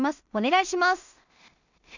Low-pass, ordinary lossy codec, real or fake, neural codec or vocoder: 7.2 kHz; none; fake; codec, 16 kHz in and 24 kHz out, 0.4 kbps, LongCat-Audio-Codec, two codebook decoder